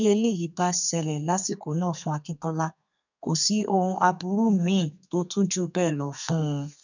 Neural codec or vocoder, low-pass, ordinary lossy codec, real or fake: codec, 32 kHz, 1.9 kbps, SNAC; 7.2 kHz; none; fake